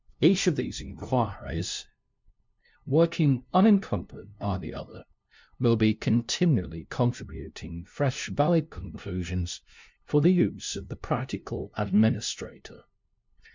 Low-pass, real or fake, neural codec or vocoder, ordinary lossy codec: 7.2 kHz; fake; codec, 16 kHz, 0.5 kbps, FunCodec, trained on LibriTTS, 25 frames a second; MP3, 64 kbps